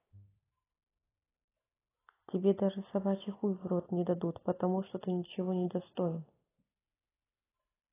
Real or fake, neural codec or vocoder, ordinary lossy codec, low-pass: real; none; AAC, 16 kbps; 3.6 kHz